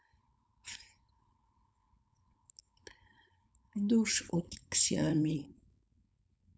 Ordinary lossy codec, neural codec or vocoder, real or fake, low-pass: none; codec, 16 kHz, 4.8 kbps, FACodec; fake; none